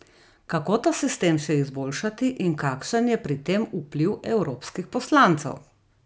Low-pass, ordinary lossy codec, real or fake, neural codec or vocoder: none; none; real; none